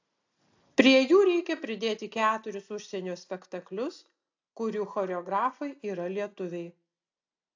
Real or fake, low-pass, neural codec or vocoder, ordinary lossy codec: real; 7.2 kHz; none; AAC, 48 kbps